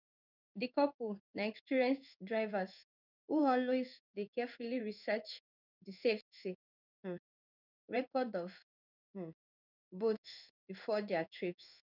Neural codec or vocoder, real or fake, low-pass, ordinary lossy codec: codec, 16 kHz in and 24 kHz out, 1 kbps, XY-Tokenizer; fake; 5.4 kHz; none